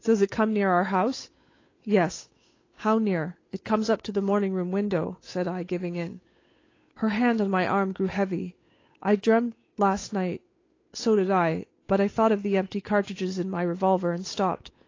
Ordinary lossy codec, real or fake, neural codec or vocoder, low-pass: AAC, 32 kbps; fake; codec, 16 kHz, 8 kbps, FunCodec, trained on Chinese and English, 25 frames a second; 7.2 kHz